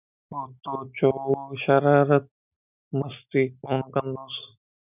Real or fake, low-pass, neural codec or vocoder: real; 3.6 kHz; none